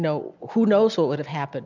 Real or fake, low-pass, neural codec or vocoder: real; 7.2 kHz; none